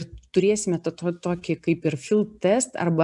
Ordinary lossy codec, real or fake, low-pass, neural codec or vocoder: MP3, 96 kbps; real; 10.8 kHz; none